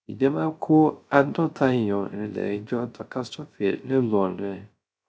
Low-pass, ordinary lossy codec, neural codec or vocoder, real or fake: none; none; codec, 16 kHz, 0.3 kbps, FocalCodec; fake